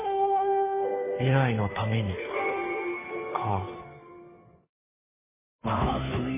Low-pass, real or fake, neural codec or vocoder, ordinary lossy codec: 3.6 kHz; fake; codec, 16 kHz, 2 kbps, FunCodec, trained on Chinese and English, 25 frames a second; MP3, 16 kbps